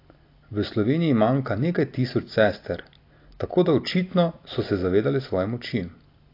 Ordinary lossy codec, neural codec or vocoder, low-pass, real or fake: AAC, 32 kbps; none; 5.4 kHz; real